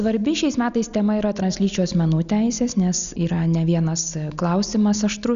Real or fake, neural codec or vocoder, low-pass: real; none; 7.2 kHz